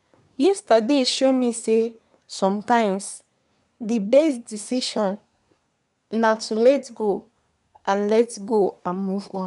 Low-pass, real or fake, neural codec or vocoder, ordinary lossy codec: 10.8 kHz; fake; codec, 24 kHz, 1 kbps, SNAC; none